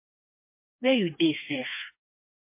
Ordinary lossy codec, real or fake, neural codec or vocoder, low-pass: MP3, 24 kbps; fake; codec, 16 kHz, 2 kbps, FreqCodec, smaller model; 3.6 kHz